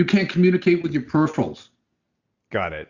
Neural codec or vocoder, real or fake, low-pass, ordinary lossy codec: none; real; 7.2 kHz; Opus, 64 kbps